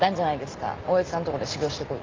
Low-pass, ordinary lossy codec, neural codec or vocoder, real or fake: 7.2 kHz; Opus, 32 kbps; none; real